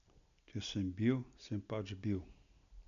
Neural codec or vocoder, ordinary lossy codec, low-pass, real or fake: none; MP3, 96 kbps; 7.2 kHz; real